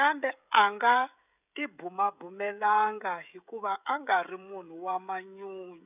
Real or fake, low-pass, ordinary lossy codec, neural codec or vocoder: fake; 3.6 kHz; none; codec, 16 kHz, 16 kbps, FreqCodec, smaller model